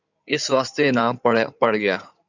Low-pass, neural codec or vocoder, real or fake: 7.2 kHz; codec, 16 kHz in and 24 kHz out, 2.2 kbps, FireRedTTS-2 codec; fake